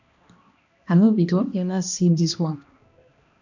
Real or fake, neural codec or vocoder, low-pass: fake; codec, 16 kHz, 1 kbps, X-Codec, HuBERT features, trained on balanced general audio; 7.2 kHz